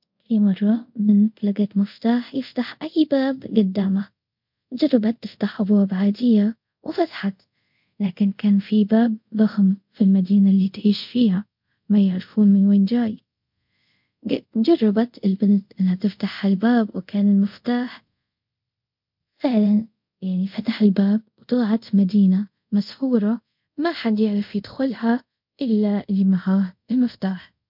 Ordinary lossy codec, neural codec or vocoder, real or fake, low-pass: MP3, 48 kbps; codec, 24 kHz, 0.5 kbps, DualCodec; fake; 5.4 kHz